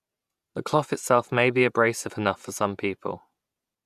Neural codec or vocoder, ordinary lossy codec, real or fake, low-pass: vocoder, 48 kHz, 128 mel bands, Vocos; none; fake; 14.4 kHz